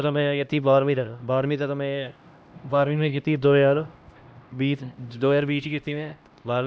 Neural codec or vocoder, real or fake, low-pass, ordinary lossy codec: codec, 16 kHz, 1 kbps, X-Codec, HuBERT features, trained on LibriSpeech; fake; none; none